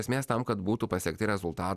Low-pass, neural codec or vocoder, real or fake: 14.4 kHz; none; real